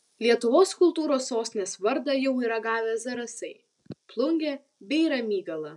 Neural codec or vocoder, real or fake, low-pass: none; real; 10.8 kHz